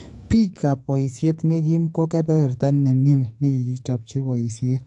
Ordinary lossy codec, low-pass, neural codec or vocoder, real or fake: AAC, 64 kbps; 10.8 kHz; codec, 44.1 kHz, 2.6 kbps, SNAC; fake